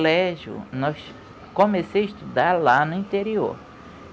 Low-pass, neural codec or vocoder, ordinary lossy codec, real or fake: none; none; none; real